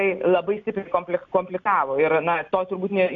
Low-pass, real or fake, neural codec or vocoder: 7.2 kHz; real; none